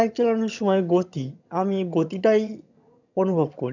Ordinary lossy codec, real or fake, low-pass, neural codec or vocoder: none; fake; 7.2 kHz; vocoder, 22.05 kHz, 80 mel bands, HiFi-GAN